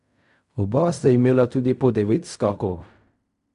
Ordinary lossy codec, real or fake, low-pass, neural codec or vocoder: AAC, 96 kbps; fake; 10.8 kHz; codec, 16 kHz in and 24 kHz out, 0.4 kbps, LongCat-Audio-Codec, fine tuned four codebook decoder